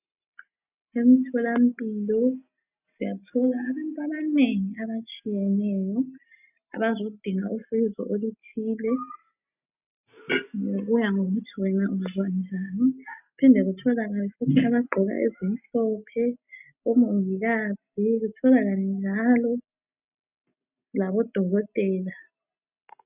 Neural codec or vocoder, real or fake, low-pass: none; real; 3.6 kHz